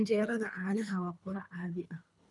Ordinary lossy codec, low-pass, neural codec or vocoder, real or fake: none; 10.8 kHz; codec, 24 kHz, 3 kbps, HILCodec; fake